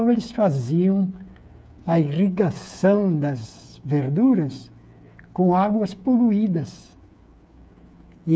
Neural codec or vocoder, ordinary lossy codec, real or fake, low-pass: codec, 16 kHz, 8 kbps, FreqCodec, smaller model; none; fake; none